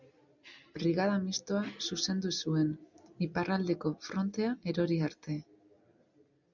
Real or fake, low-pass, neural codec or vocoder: real; 7.2 kHz; none